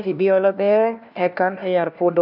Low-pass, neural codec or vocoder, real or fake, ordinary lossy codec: 5.4 kHz; codec, 16 kHz, 1 kbps, FunCodec, trained on LibriTTS, 50 frames a second; fake; none